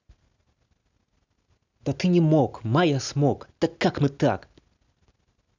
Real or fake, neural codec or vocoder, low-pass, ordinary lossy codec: real; none; 7.2 kHz; none